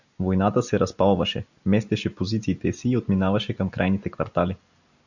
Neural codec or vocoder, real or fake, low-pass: none; real; 7.2 kHz